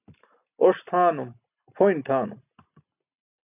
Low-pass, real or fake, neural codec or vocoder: 3.6 kHz; real; none